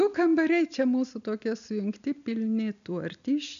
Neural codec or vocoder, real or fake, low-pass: none; real; 7.2 kHz